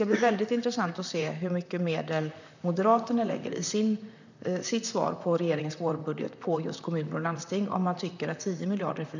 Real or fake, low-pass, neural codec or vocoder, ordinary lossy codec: fake; 7.2 kHz; vocoder, 44.1 kHz, 128 mel bands, Pupu-Vocoder; none